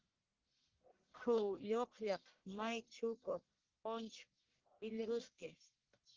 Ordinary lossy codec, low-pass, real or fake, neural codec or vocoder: Opus, 16 kbps; 7.2 kHz; fake; codec, 44.1 kHz, 1.7 kbps, Pupu-Codec